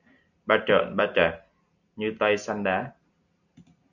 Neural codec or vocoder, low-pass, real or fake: none; 7.2 kHz; real